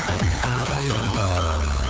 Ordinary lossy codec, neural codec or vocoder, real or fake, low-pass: none; codec, 16 kHz, 8 kbps, FunCodec, trained on LibriTTS, 25 frames a second; fake; none